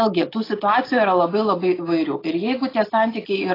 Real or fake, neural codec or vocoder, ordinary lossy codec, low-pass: real; none; AAC, 24 kbps; 5.4 kHz